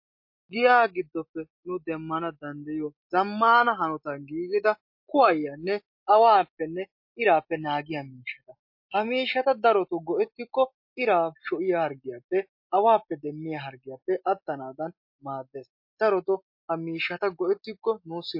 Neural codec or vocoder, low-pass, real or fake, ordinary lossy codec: none; 5.4 kHz; real; MP3, 32 kbps